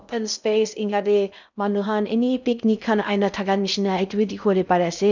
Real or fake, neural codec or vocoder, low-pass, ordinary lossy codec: fake; codec, 16 kHz in and 24 kHz out, 0.6 kbps, FocalCodec, streaming, 2048 codes; 7.2 kHz; none